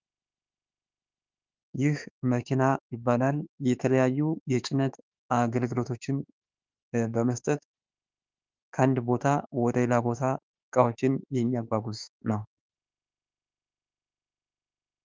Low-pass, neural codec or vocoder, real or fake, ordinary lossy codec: 7.2 kHz; autoencoder, 48 kHz, 32 numbers a frame, DAC-VAE, trained on Japanese speech; fake; Opus, 24 kbps